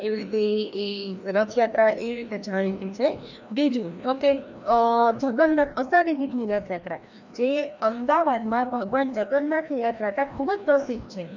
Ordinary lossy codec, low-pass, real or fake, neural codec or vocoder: none; 7.2 kHz; fake; codec, 16 kHz, 1 kbps, FreqCodec, larger model